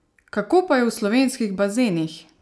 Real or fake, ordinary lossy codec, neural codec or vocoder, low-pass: real; none; none; none